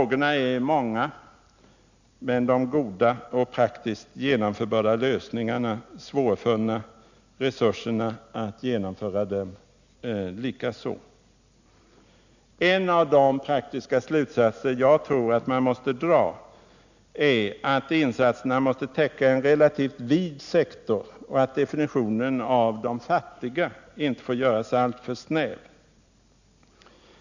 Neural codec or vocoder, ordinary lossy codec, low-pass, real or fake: none; none; 7.2 kHz; real